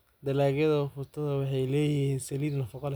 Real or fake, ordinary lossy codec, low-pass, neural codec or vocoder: real; none; none; none